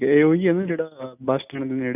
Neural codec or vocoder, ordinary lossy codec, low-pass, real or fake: none; none; 3.6 kHz; real